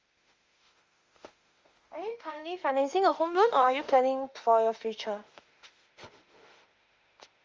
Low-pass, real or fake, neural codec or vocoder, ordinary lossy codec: 7.2 kHz; fake; autoencoder, 48 kHz, 32 numbers a frame, DAC-VAE, trained on Japanese speech; Opus, 32 kbps